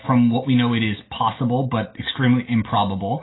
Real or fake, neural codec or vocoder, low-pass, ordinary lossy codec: real; none; 7.2 kHz; AAC, 16 kbps